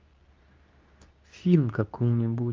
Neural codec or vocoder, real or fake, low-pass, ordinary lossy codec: codec, 24 kHz, 0.9 kbps, WavTokenizer, medium speech release version 2; fake; 7.2 kHz; Opus, 24 kbps